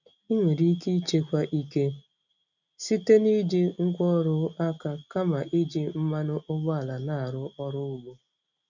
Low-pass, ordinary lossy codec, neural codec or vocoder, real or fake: 7.2 kHz; none; none; real